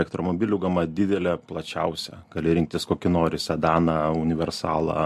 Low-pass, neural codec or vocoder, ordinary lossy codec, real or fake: 14.4 kHz; none; MP3, 64 kbps; real